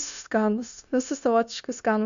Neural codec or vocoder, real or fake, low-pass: codec, 16 kHz, 0.9 kbps, LongCat-Audio-Codec; fake; 7.2 kHz